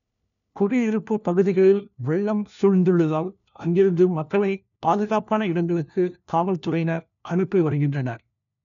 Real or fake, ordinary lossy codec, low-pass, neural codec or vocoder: fake; none; 7.2 kHz; codec, 16 kHz, 1 kbps, FunCodec, trained on LibriTTS, 50 frames a second